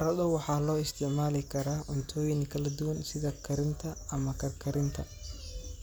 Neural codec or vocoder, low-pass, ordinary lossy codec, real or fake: none; none; none; real